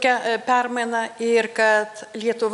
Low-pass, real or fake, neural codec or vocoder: 10.8 kHz; real; none